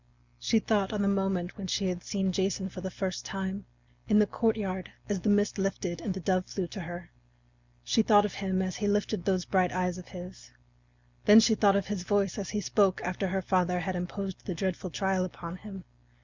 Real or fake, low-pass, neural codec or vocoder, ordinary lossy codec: real; 7.2 kHz; none; Opus, 64 kbps